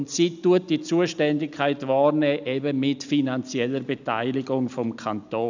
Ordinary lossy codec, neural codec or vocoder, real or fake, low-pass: none; none; real; 7.2 kHz